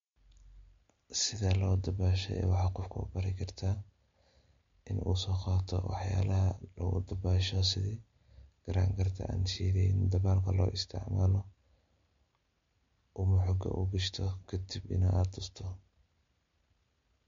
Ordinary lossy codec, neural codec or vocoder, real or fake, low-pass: MP3, 48 kbps; none; real; 7.2 kHz